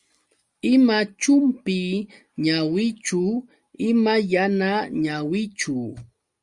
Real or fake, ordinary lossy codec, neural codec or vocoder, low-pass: real; Opus, 64 kbps; none; 10.8 kHz